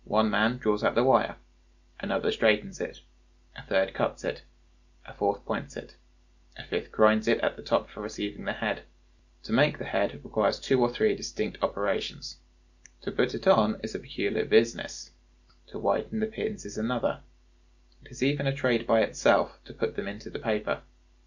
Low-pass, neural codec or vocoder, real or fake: 7.2 kHz; none; real